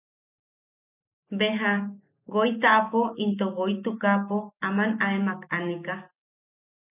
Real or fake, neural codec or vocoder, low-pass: real; none; 3.6 kHz